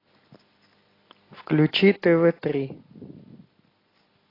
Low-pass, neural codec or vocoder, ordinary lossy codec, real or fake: 5.4 kHz; none; AAC, 24 kbps; real